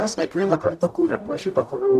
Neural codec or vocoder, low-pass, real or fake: codec, 44.1 kHz, 0.9 kbps, DAC; 14.4 kHz; fake